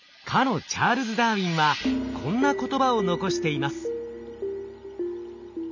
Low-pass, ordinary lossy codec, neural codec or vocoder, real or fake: 7.2 kHz; none; none; real